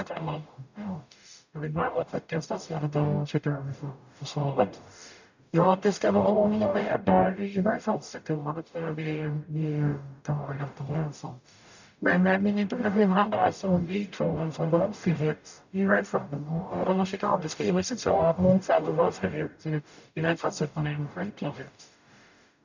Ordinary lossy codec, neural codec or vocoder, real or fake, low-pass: none; codec, 44.1 kHz, 0.9 kbps, DAC; fake; 7.2 kHz